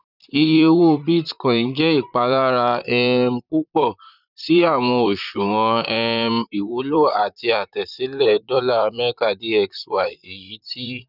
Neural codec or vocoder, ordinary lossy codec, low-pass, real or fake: vocoder, 44.1 kHz, 128 mel bands, Pupu-Vocoder; none; 5.4 kHz; fake